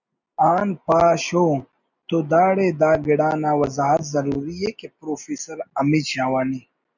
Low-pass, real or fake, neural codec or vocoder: 7.2 kHz; real; none